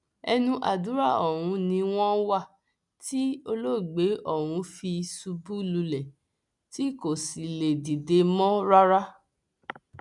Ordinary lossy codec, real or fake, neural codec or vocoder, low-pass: none; real; none; 10.8 kHz